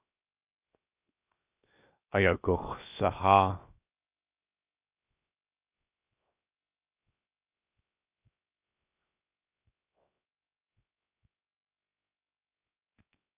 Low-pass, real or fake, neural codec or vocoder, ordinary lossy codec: 3.6 kHz; fake; codec, 16 kHz, 0.7 kbps, FocalCodec; Opus, 64 kbps